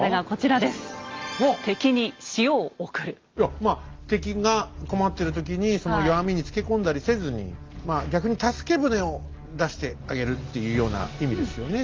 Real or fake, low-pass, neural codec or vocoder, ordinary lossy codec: real; 7.2 kHz; none; Opus, 32 kbps